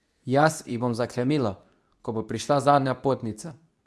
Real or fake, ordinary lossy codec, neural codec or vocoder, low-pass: fake; none; codec, 24 kHz, 0.9 kbps, WavTokenizer, medium speech release version 2; none